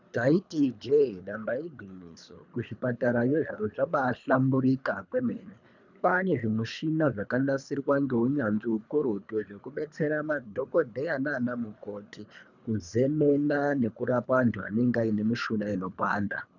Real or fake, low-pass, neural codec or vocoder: fake; 7.2 kHz; codec, 24 kHz, 3 kbps, HILCodec